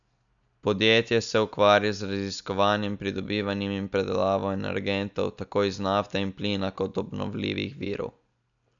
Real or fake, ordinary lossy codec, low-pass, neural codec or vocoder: real; none; 7.2 kHz; none